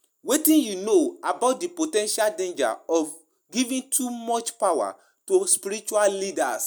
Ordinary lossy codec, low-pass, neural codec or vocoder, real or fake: none; none; none; real